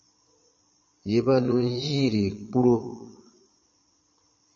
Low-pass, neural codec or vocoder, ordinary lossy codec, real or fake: 9.9 kHz; vocoder, 22.05 kHz, 80 mel bands, Vocos; MP3, 32 kbps; fake